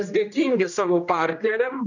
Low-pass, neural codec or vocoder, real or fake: 7.2 kHz; codec, 16 kHz, 1 kbps, X-Codec, HuBERT features, trained on general audio; fake